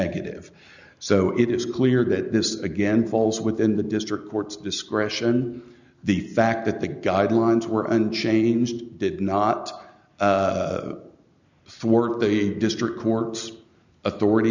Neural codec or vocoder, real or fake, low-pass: none; real; 7.2 kHz